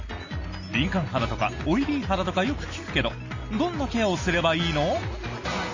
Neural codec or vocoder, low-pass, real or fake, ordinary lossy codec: none; 7.2 kHz; real; MP3, 32 kbps